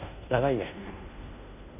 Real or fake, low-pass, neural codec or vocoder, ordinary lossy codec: fake; 3.6 kHz; codec, 16 kHz in and 24 kHz out, 0.9 kbps, LongCat-Audio-Codec, fine tuned four codebook decoder; none